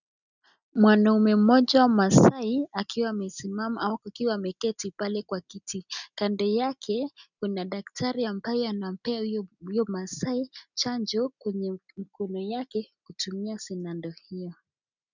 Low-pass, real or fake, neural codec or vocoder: 7.2 kHz; real; none